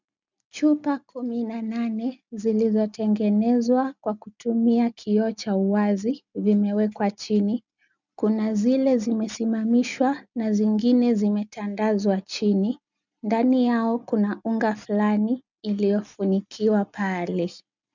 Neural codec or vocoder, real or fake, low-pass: none; real; 7.2 kHz